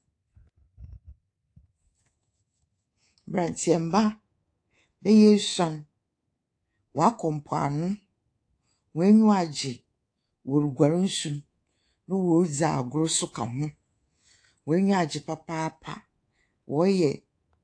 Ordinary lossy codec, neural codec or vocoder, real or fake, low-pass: AAC, 48 kbps; codec, 24 kHz, 3.1 kbps, DualCodec; fake; 9.9 kHz